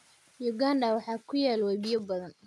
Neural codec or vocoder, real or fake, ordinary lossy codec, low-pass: none; real; none; none